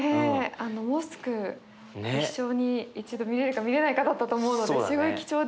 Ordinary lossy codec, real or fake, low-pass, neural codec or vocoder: none; real; none; none